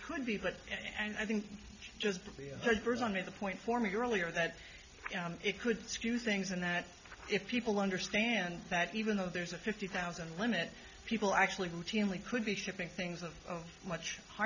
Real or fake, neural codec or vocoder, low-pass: real; none; 7.2 kHz